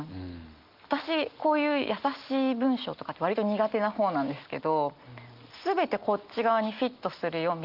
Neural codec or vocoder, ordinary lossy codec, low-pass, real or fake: none; Opus, 32 kbps; 5.4 kHz; real